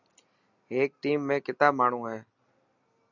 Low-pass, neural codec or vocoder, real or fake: 7.2 kHz; none; real